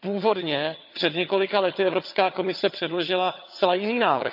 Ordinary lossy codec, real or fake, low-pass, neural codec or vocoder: none; fake; 5.4 kHz; vocoder, 22.05 kHz, 80 mel bands, HiFi-GAN